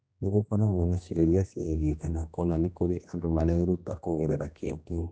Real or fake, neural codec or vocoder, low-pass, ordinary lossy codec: fake; codec, 16 kHz, 1 kbps, X-Codec, HuBERT features, trained on general audio; none; none